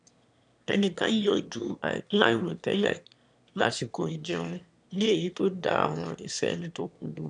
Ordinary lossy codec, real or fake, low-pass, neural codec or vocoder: none; fake; 9.9 kHz; autoencoder, 22.05 kHz, a latent of 192 numbers a frame, VITS, trained on one speaker